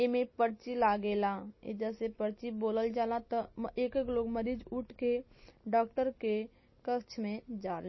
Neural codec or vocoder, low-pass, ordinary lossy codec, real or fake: none; 7.2 kHz; MP3, 24 kbps; real